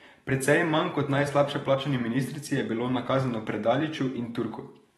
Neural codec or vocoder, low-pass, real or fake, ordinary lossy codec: vocoder, 44.1 kHz, 128 mel bands every 512 samples, BigVGAN v2; 19.8 kHz; fake; AAC, 32 kbps